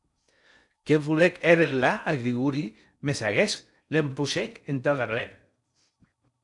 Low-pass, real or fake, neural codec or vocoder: 10.8 kHz; fake; codec, 16 kHz in and 24 kHz out, 0.6 kbps, FocalCodec, streaming, 4096 codes